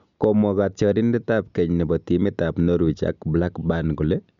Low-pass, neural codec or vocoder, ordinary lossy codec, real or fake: 7.2 kHz; none; MP3, 64 kbps; real